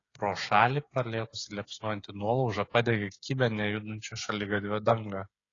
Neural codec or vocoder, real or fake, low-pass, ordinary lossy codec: codec, 16 kHz, 8 kbps, FreqCodec, smaller model; fake; 7.2 kHz; AAC, 32 kbps